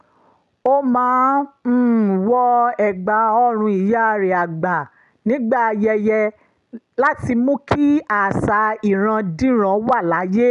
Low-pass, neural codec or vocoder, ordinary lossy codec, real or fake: 10.8 kHz; none; none; real